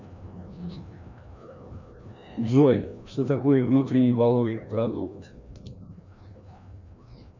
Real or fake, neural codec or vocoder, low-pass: fake; codec, 16 kHz, 1 kbps, FreqCodec, larger model; 7.2 kHz